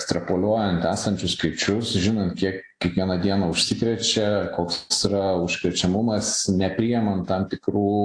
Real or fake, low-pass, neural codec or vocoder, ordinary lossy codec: real; 9.9 kHz; none; AAC, 48 kbps